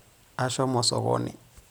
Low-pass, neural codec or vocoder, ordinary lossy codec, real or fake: none; none; none; real